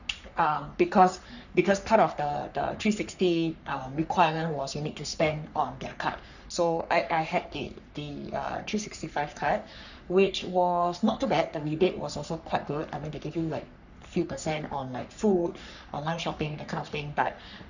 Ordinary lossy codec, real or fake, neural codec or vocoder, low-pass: none; fake; codec, 44.1 kHz, 3.4 kbps, Pupu-Codec; 7.2 kHz